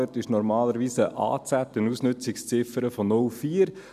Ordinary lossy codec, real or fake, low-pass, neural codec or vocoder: none; real; 14.4 kHz; none